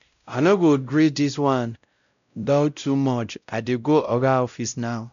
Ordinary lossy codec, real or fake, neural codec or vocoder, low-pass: none; fake; codec, 16 kHz, 0.5 kbps, X-Codec, WavLM features, trained on Multilingual LibriSpeech; 7.2 kHz